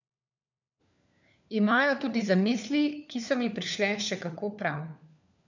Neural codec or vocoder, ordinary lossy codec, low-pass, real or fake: codec, 16 kHz, 4 kbps, FunCodec, trained on LibriTTS, 50 frames a second; none; 7.2 kHz; fake